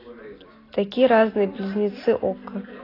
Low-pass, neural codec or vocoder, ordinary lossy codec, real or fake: 5.4 kHz; none; AAC, 24 kbps; real